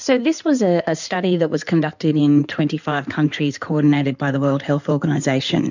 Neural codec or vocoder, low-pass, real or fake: codec, 16 kHz in and 24 kHz out, 2.2 kbps, FireRedTTS-2 codec; 7.2 kHz; fake